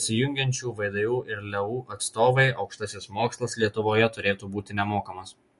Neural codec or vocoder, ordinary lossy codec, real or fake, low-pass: none; MP3, 48 kbps; real; 14.4 kHz